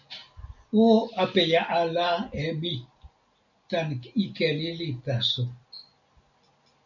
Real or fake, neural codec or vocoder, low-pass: real; none; 7.2 kHz